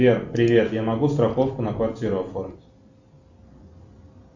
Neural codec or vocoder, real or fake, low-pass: none; real; 7.2 kHz